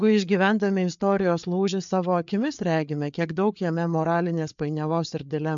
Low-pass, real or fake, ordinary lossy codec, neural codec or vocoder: 7.2 kHz; fake; MP3, 64 kbps; codec, 16 kHz, 4 kbps, FreqCodec, larger model